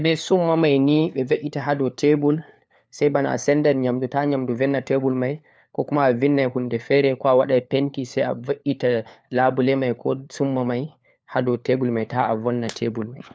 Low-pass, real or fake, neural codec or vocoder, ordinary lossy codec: none; fake; codec, 16 kHz, 4 kbps, FunCodec, trained on LibriTTS, 50 frames a second; none